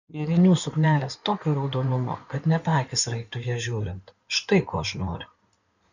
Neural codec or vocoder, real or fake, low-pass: codec, 16 kHz in and 24 kHz out, 2.2 kbps, FireRedTTS-2 codec; fake; 7.2 kHz